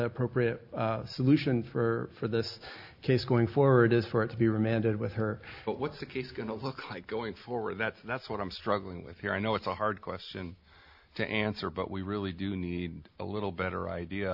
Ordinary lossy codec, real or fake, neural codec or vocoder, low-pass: AAC, 48 kbps; real; none; 5.4 kHz